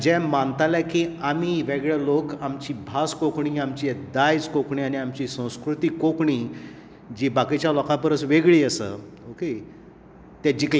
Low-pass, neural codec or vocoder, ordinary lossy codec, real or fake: none; none; none; real